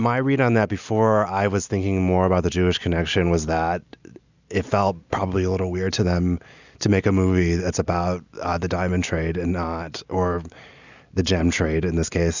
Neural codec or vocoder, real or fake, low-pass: none; real; 7.2 kHz